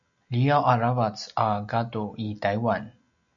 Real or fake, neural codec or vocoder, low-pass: real; none; 7.2 kHz